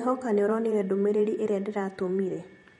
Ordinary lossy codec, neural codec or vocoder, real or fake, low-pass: MP3, 48 kbps; vocoder, 44.1 kHz, 128 mel bands every 512 samples, BigVGAN v2; fake; 19.8 kHz